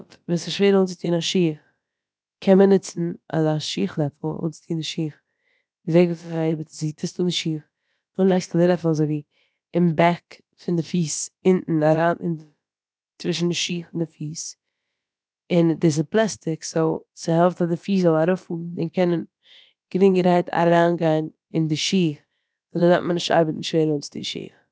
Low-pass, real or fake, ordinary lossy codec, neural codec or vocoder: none; fake; none; codec, 16 kHz, about 1 kbps, DyCAST, with the encoder's durations